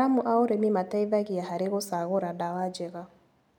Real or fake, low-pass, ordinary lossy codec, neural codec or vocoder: real; 19.8 kHz; none; none